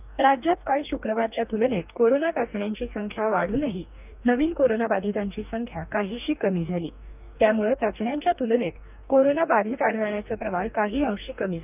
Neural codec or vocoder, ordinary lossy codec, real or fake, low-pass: codec, 44.1 kHz, 2.6 kbps, DAC; none; fake; 3.6 kHz